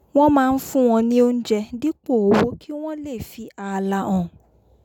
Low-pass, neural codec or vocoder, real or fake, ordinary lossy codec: 19.8 kHz; none; real; none